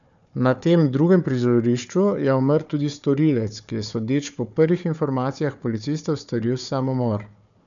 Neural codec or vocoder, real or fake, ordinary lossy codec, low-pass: codec, 16 kHz, 4 kbps, FunCodec, trained on Chinese and English, 50 frames a second; fake; none; 7.2 kHz